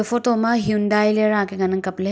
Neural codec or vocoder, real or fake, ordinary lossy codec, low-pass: none; real; none; none